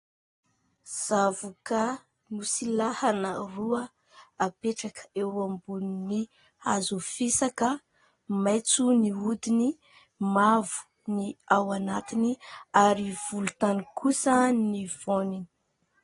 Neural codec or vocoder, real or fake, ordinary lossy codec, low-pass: none; real; AAC, 32 kbps; 10.8 kHz